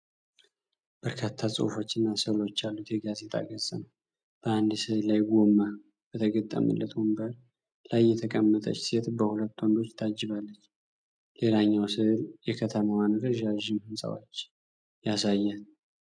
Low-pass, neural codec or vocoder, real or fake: 9.9 kHz; none; real